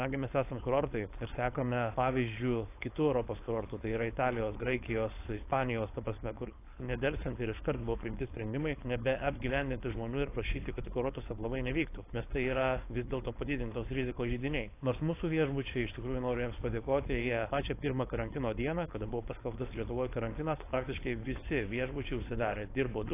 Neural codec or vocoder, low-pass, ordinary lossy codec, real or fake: codec, 16 kHz, 4.8 kbps, FACodec; 3.6 kHz; AAC, 24 kbps; fake